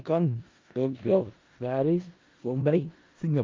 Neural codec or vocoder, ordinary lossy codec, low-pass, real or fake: codec, 16 kHz in and 24 kHz out, 0.4 kbps, LongCat-Audio-Codec, four codebook decoder; Opus, 24 kbps; 7.2 kHz; fake